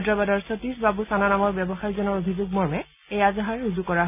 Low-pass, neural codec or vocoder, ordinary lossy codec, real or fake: 3.6 kHz; none; none; real